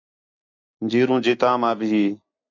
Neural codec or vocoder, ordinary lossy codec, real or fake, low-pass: none; AAC, 48 kbps; real; 7.2 kHz